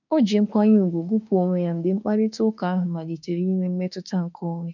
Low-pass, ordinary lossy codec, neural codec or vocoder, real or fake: 7.2 kHz; none; autoencoder, 48 kHz, 32 numbers a frame, DAC-VAE, trained on Japanese speech; fake